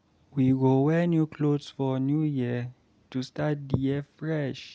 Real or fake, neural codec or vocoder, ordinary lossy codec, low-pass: real; none; none; none